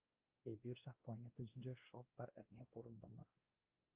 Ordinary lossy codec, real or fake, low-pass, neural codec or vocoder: Opus, 24 kbps; fake; 3.6 kHz; codec, 16 kHz, 1 kbps, X-Codec, WavLM features, trained on Multilingual LibriSpeech